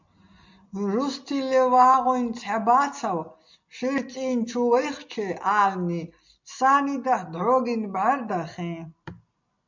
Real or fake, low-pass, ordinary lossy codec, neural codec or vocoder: real; 7.2 kHz; MP3, 64 kbps; none